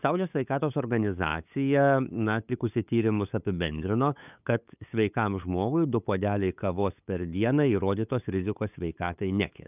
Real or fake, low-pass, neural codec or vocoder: fake; 3.6 kHz; codec, 16 kHz, 8 kbps, FunCodec, trained on LibriTTS, 25 frames a second